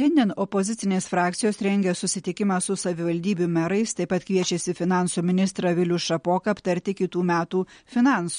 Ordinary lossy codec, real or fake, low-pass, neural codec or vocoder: MP3, 48 kbps; real; 9.9 kHz; none